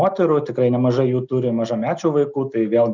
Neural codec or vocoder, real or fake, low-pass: none; real; 7.2 kHz